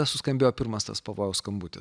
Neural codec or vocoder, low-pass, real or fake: none; 9.9 kHz; real